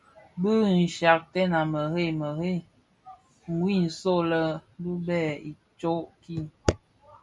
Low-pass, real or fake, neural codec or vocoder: 10.8 kHz; real; none